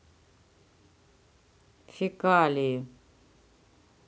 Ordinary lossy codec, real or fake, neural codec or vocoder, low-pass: none; real; none; none